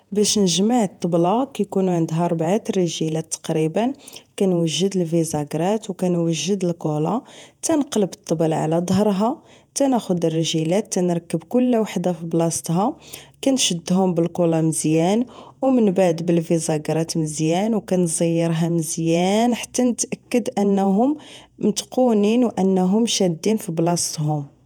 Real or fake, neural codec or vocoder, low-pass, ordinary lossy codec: fake; vocoder, 48 kHz, 128 mel bands, Vocos; 19.8 kHz; none